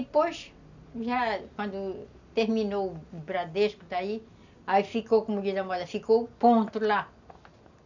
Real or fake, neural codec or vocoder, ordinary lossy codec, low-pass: real; none; none; 7.2 kHz